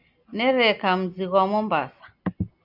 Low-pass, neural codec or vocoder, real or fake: 5.4 kHz; none; real